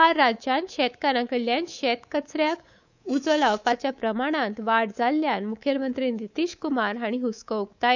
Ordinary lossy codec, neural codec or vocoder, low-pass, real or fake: none; codec, 24 kHz, 3.1 kbps, DualCodec; 7.2 kHz; fake